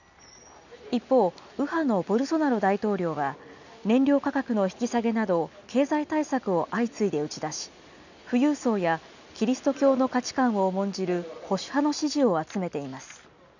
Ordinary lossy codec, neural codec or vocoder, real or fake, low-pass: none; none; real; 7.2 kHz